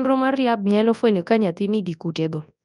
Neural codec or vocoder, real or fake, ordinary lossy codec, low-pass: codec, 24 kHz, 0.9 kbps, WavTokenizer, large speech release; fake; none; 10.8 kHz